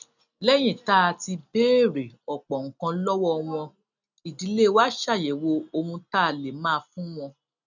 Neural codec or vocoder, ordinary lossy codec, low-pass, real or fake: none; none; 7.2 kHz; real